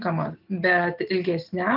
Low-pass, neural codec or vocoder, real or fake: 5.4 kHz; none; real